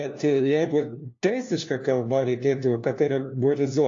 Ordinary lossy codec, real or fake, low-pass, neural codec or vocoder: AAC, 48 kbps; fake; 7.2 kHz; codec, 16 kHz, 1 kbps, FunCodec, trained on LibriTTS, 50 frames a second